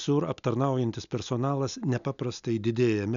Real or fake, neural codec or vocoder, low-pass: real; none; 7.2 kHz